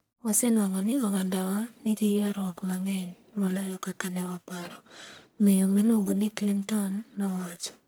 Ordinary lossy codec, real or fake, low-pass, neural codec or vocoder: none; fake; none; codec, 44.1 kHz, 1.7 kbps, Pupu-Codec